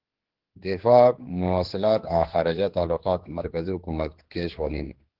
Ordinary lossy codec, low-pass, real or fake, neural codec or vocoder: Opus, 16 kbps; 5.4 kHz; fake; codec, 16 kHz, 2 kbps, X-Codec, HuBERT features, trained on general audio